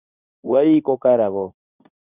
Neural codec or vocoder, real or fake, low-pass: codec, 24 kHz, 0.9 kbps, WavTokenizer, medium speech release version 2; fake; 3.6 kHz